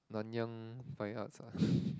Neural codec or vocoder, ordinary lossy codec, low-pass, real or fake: none; none; none; real